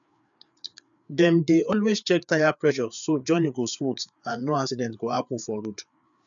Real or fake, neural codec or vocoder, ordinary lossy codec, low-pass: fake; codec, 16 kHz, 4 kbps, FreqCodec, larger model; none; 7.2 kHz